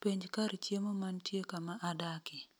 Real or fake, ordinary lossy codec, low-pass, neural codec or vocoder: real; none; none; none